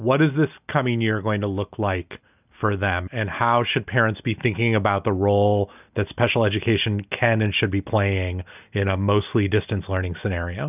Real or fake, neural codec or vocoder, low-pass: real; none; 3.6 kHz